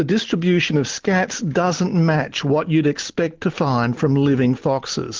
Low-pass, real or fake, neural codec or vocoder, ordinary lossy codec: 7.2 kHz; real; none; Opus, 16 kbps